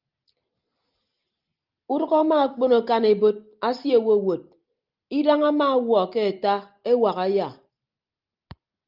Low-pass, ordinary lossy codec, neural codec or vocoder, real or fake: 5.4 kHz; Opus, 24 kbps; none; real